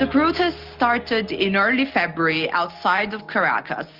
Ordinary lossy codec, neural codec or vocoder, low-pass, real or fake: Opus, 32 kbps; none; 5.4 kHz; real